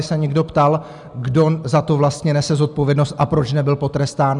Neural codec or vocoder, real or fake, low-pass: none; real; 10.8 kHz